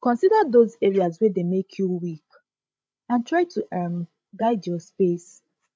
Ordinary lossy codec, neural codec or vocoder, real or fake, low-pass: none; codec, 16 kHz, 16 kbps, FreqCodec, larger model; fake; none